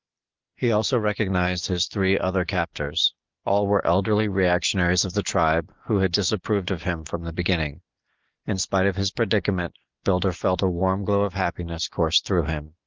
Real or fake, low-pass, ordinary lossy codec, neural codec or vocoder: real; 7.2 kHz; Opus, 32 kbps; none